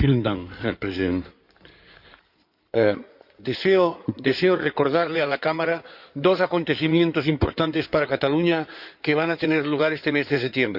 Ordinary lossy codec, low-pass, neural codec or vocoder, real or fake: none; 5.4 kHz; codec, 16 kHz in and 24 kHz out, 2.2 kbps, FireRedTTS-2 codec; fake